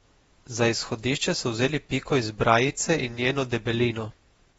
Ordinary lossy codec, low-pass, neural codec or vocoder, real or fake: AAC, 24 kbps; 19.8 kHz; vocoder, 48 kHz, 128 mel bands, Vocos; fake